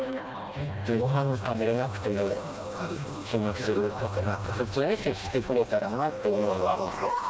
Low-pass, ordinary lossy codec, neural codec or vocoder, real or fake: none; none; codec, 16 kHz, 1 kbps, FreqCodec, smaller model; fake